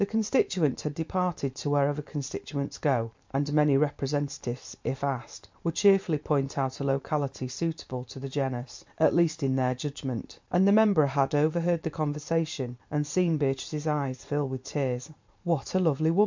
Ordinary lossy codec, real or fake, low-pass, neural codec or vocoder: MP3, 64 kbps; fake; 7.2 kHz; vocoder, 44.1 kHz, 128 mel bands every 512 samples, BigVGAN v2